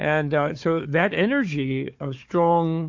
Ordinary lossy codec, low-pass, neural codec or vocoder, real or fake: MP3, 48 kbps; 7.2 kHz; codec, 16 kHz, 4 kbps, FunCodec, trained on Chinese and English, 50 frames a second; fake